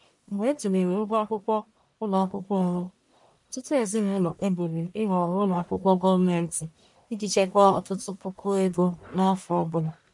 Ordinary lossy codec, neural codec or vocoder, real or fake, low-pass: MP3, 64 kbps; codec, 44.1 kHz, 1.7 kbps, Pupu-Codec; fake; 10.8 kHz